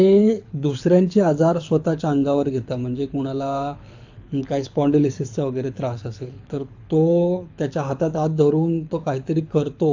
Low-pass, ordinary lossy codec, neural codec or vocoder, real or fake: 7.2 kHz; AAC, 48 kbps; codec, 24 kHz, 6 kbps, HILCodec; fake